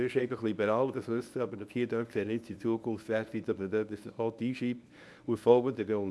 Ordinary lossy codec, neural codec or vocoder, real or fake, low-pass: none; codec, 24 kHz, 0.9 kbps, WavTokenizer, medium speech release version 1; fake; none